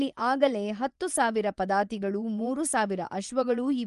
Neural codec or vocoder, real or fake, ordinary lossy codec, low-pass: vocoder, 24 kHz, 100 mel bands, Vocos; fake; Opus, 32 kbps; 10.8 kHz